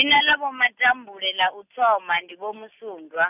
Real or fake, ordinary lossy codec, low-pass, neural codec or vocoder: real; none; 3.6 kHz; none